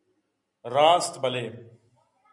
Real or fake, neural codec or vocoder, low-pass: real; none; 10.8 kHz